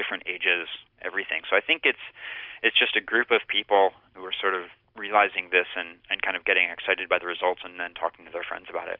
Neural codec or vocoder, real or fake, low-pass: none; real; 5.4 kHz